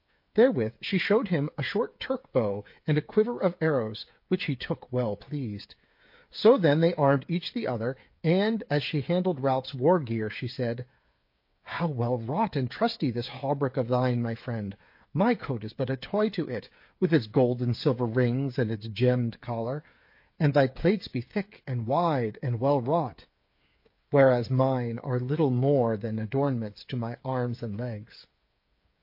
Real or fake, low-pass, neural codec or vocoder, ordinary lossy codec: fake; 5.4 kHz; codec, 16 kHz, 8 kbps, FreqCodec, smaller model; MP3, 32 kbps